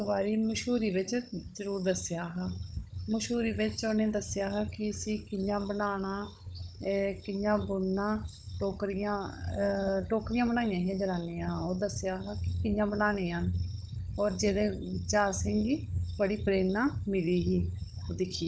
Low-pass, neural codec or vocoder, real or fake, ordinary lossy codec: none; codec, 16 kHz, 16 kbps, FunCodec, trained on Chinese and English, 50 frames a second; fake; none